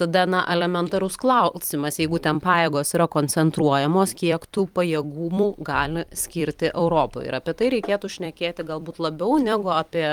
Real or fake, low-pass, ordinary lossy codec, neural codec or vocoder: fake; 19.8 kHz; Opus, 32 kbps; vocoder, 44.1 kHz, 128 mel bands every 256 samples, BigVGAN v2